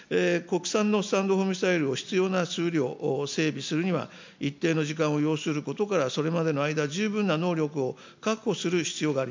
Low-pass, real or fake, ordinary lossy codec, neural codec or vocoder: 7.2 kHz; real; MP3, 64 kbps; none